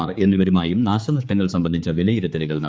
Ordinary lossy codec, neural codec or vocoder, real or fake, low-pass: none; codec, 16 kHz, 4 kbps, X-Codec, HuBERT features, trained on general audio; fake; none